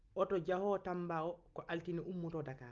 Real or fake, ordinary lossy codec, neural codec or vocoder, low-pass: real; Opus, 32 kbps; none; 7.2 kHz